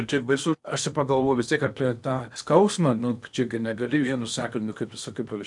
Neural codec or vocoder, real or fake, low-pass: codec, 16 kHz in and 24 kHz out, 0.8 kbps, FocalCodec, streaming, 65536 codes; fake; 10.8 kHz